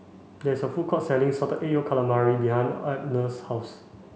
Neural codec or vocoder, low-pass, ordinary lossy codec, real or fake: none; none; none; real